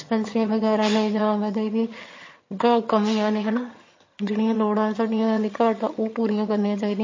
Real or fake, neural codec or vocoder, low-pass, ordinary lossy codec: fake; vocoder, 22.05 kHz, 80 mel bands, HiFi-GAN; 7.2 kHz; MP3, 32 kbps